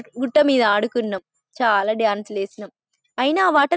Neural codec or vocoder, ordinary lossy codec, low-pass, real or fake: none; none; none; real